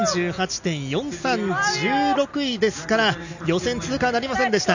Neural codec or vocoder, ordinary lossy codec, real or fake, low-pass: none; none; real; 7.2 kHz